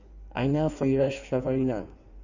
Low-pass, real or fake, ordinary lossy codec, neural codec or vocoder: 7.2 kHz; fake; none; codec, 16 kHz in and 24 kHz out, 1.1 kbps, FireRedTTS-2 codec